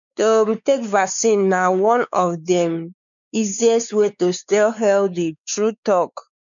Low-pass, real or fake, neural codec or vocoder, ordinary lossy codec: 7.2 kHz; fake; codec, 16 kHz, 4 kbps, X-Codec, WavLM features, trained on Multilingual LibriSpeech; none